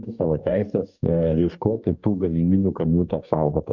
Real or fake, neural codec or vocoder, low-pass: fake; codec, 44.1 kHz, 2.6 kbps, DAC; 7.2 kHz